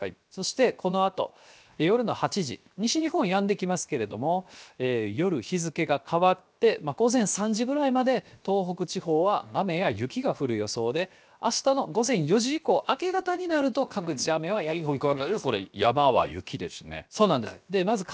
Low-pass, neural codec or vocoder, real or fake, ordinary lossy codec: none; codec, 16 kHz, 0.7 kbps, FocalCodec; fake; none